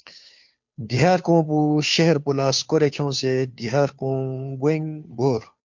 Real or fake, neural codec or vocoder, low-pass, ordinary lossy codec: fake; codec, 16 kHz, 2 kbps, FunCodec, trained on Chinese and English, 25 frames a second; 7.2 kHz; MP3, 48 kbps